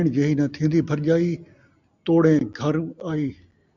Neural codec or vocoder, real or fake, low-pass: none; real; 7.2 kHz